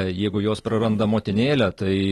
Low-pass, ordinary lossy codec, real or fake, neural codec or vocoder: 19.8 kHz; AAC, 32 kbps; real; none